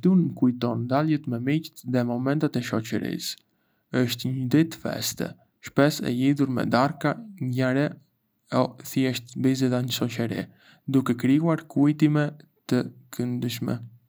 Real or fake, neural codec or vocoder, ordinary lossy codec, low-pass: real; none; none; none